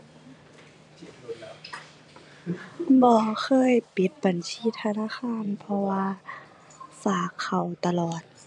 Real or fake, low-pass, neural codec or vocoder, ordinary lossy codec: fake; 10.8 kHz; vocoder, 44.1 kHz, 128 mel bands every 256 samples, BigVGAN v2; none